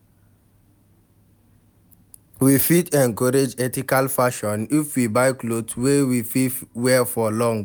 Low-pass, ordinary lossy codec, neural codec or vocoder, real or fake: none; none; none; real